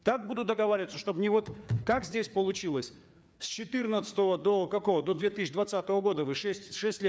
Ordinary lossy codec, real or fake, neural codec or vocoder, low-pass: none; fake; codec, 16 kHz, 4 kbps, FreqCodec, larger model; none